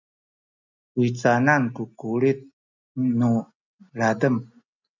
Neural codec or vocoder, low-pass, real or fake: none; 7.2 kHz; real